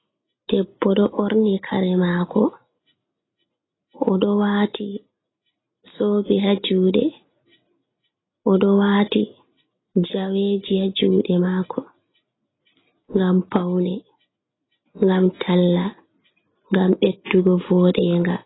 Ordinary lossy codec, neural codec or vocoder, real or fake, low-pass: AAC, 16 kbps; none; real; 7.2 kHz